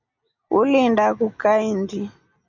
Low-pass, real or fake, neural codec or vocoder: 7.2 kHz; real; none